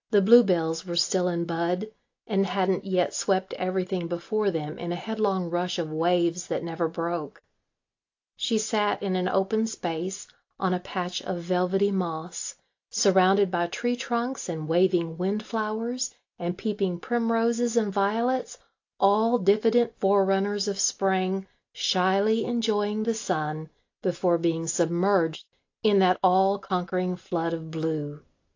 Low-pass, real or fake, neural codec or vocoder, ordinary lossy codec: 7.2 kHz; real; none; AAC, 48 kbps